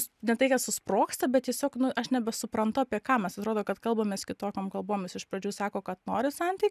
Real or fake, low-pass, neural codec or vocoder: real; 14.4 kHz; none